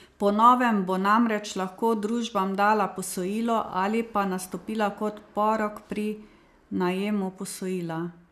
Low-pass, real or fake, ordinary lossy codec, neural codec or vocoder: 14.4 kHz; real; none; none